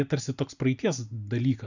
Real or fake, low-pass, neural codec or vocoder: real; 7.2 kHz; none